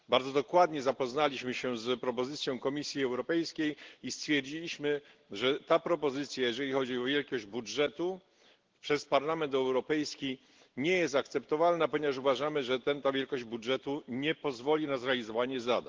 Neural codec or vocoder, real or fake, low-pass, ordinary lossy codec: none; real; 7.2 kHz; Opus, 16 kbps